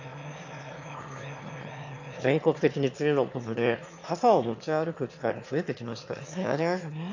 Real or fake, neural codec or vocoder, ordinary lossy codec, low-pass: fake; autoencoder, 22.05 kHz, a latent of 192 numbers a frame, VITS, trained on one speaker; MP3, 48 kbps; 7.2 kHz